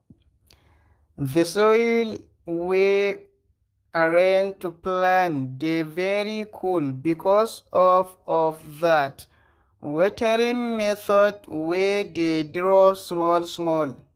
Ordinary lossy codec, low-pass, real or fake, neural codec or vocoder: Opus, 32 kbps; 14.4 kHz; fake; codec, 32 kHz, 1.9 kbps, SNAC